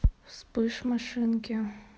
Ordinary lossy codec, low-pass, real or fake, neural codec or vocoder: none; none; real; none